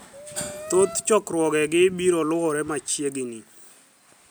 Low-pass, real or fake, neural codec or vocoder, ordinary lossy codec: none; real; none; none